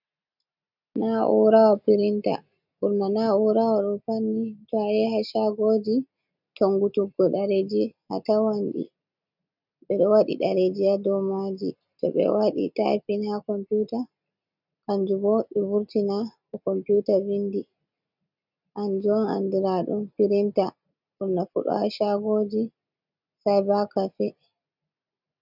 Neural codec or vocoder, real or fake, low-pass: none; real; 5.4 kHz